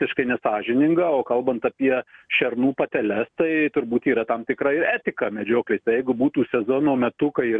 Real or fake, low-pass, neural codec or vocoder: real; 9.9 kHz; none